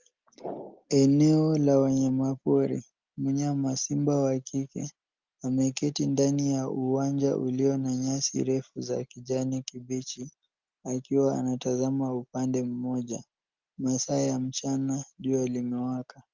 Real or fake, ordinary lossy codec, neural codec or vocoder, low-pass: real; Opus, 32 kbps; none; 7.2 kHz